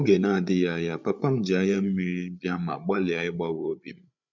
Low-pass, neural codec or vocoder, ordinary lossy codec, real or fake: 7.2 kHz; codec, 16 kHz, 8 kbps, FreqCodec, larger model; MP3, 64 kbps; fake